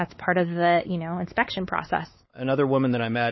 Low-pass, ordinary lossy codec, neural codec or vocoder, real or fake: 7.2 kHz; MP3, 24 kbps; codec, 16 kHz, 4 kbps, X-Codec, WavLM features, trained on Multilingual LibriSpeech; fake